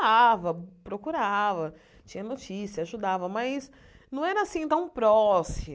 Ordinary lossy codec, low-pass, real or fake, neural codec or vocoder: none; none; real; none